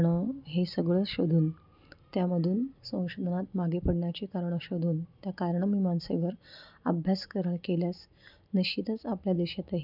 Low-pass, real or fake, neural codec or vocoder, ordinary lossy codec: 5.4 kHz; real; none; none